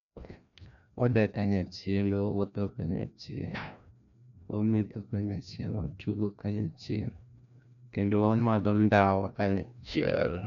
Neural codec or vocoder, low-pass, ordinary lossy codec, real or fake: codec, 16 kHz, 1 kbps, FreqCodec, larger model; 7.2 kHz; none; fake